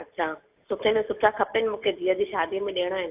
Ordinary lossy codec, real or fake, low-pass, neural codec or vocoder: AAC, 32 kbps; real; 3.6 kHz; none